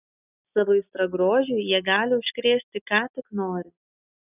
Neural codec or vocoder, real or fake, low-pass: none; real; 3.6 kHz